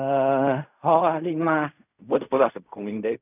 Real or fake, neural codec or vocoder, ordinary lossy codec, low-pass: fake; codec, 16 kHz in and 24 kHz out, 0.4 kbps, LongCat-Audio-Codec, fine tuned four codebook decoder; none; 3.6 kHz